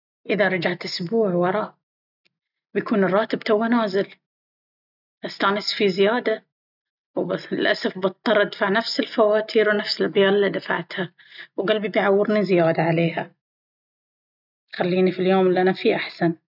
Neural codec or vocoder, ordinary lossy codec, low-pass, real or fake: none; none; 5.4 kHz; real